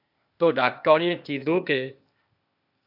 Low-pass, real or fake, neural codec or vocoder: 5.4 kHz; fake; codec, 16 kHz, 0.8 kbps, ZipCodec